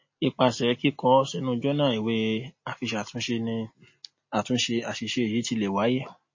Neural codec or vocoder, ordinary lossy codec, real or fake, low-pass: none; MP3, 32 kbps; real; 7.2 kHz